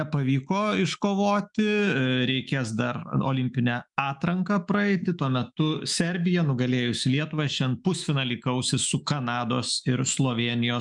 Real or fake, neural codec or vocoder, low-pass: fake; autoencoder, 48 kHz, 128 numbers a frame, DAC-VAE, trained on Japanese speech; 10.8 kHz